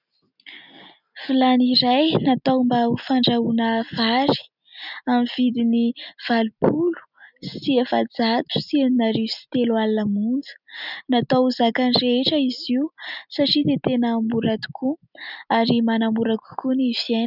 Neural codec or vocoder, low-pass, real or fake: none; 5.4 kHz; real